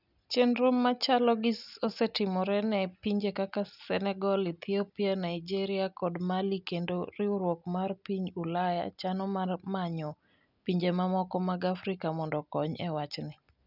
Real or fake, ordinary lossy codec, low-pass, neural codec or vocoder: real; none; 5.4 kHz; none